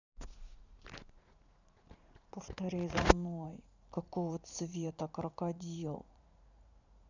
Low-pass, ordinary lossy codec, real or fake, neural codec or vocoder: 7.2 kHz; none; fake; vocoder, 44.1 kHz, 80 mel bands, Vocos